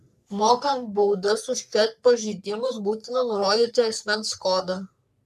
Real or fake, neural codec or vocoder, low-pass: fake; codec, 44.1 kHz, 3.4 kbps, Pupu-Codec; 14.4 kHz